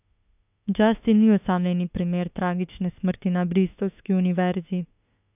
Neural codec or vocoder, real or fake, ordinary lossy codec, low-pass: autoencoder, 48 kHz, 32 numbers a frame, DAC-VAE, trained on Japanese speech; fake; none; 3.6 kHz